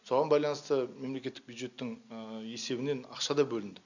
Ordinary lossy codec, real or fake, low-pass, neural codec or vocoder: none; real; 7.2 kHz; none